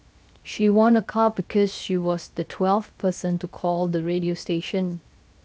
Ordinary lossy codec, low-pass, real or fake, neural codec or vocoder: none; none; fake; codec, 16 kHz, 0.7 kbps, FocalCodec